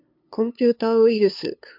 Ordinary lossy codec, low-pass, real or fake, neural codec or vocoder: MP3, 48 kbps; 5.4 kHz; fake; codec, 16 kHz, 2 kbps, FunCodec, trained on LibriTTS, 25 frames a second